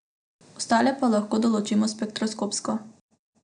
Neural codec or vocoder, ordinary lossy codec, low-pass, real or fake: none; none; 9.9 kHz; real